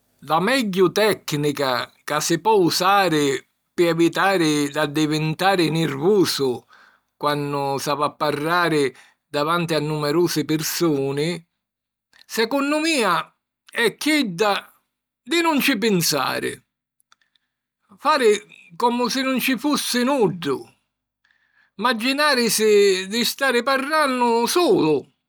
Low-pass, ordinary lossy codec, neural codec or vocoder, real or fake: none; none; none; real